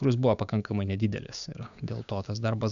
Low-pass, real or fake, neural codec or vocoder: 7.2 kHz; fake; codec, 16 kHz, 6 kbps, DAC